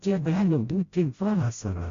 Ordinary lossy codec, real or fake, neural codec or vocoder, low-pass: Opus, 64 kbps; fake; codec, 16 kHz, 0.5 kbps, FreqCodec, smaller model; 7.2 kHz